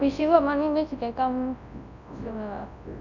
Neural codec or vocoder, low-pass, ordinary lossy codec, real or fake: codec, 24 kHz, 0.9 kbps, WavTokenizer, large speech release; 7.2 kHz; none; fake